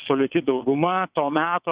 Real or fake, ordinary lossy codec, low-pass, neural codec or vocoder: fake; Opus, 64 kbps; 3.6 kHz; vocoder, 22.05 kHz, 80 mel bands, WaveNeXt